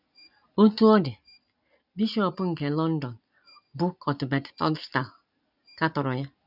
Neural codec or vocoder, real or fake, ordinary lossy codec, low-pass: none; real; none; 5.4 kHz